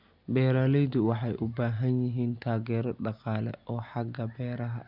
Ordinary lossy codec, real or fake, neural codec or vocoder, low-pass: none; real; none; 5.4 kHz